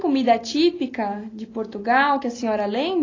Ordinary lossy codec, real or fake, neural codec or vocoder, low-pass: AAC, 32 kbps; real; none; 7.2 kHz